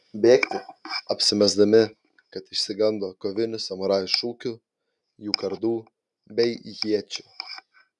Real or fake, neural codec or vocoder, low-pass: real; none; 10.8 kHz